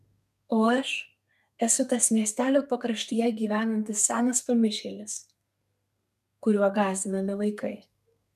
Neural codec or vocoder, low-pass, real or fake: codec, 32 kHz, 1.9 kbps, SNAC; 14.4 kHz; fake